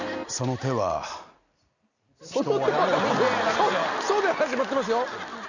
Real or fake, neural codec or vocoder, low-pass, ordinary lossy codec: real; none; 7.2 kHz; none